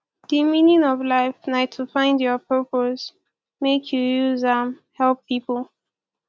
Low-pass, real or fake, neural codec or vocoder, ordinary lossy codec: none; real; none; none